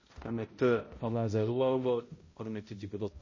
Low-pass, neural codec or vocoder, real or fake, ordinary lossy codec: 7.2 kHz; codec, 16 kHz, 0.5 kbps, X-Codec, HuBERT features, trained on balanced general audio; fake; MP3, 32 kbps